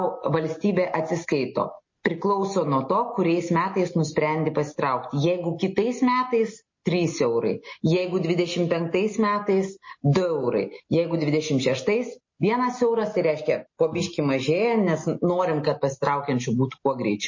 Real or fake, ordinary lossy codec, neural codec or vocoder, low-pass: real; MP3, 32 kbps; none; 7.2 kHz